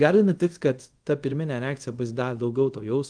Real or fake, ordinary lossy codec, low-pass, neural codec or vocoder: fake; Opus, 24 kbps; 9.9 kHz; codec, 24 kHz, 0.5 kbps, DualCodec